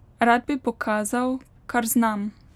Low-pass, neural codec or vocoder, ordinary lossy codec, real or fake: 19.8 kHz; none; none; real